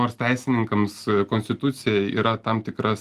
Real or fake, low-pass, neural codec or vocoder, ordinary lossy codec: real; 14.4 kHz; none; Opus, 24 kbps